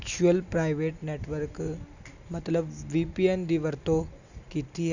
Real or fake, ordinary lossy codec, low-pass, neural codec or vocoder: real; none; 7.2 kHz; none